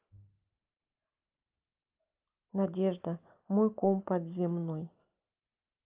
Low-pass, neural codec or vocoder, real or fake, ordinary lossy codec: 3.6 kHz; none; real; Opus, 32 kbps